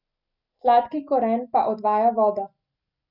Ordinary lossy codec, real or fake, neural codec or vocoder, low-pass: none; real; none; 5.4 kHz